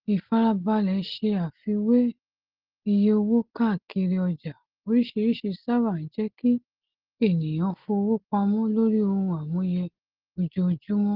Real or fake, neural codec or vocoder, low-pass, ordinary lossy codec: real; none; 5.4 kHz; Opus, 16 kbps